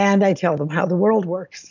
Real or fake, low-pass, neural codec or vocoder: real; 7.2 kHz; none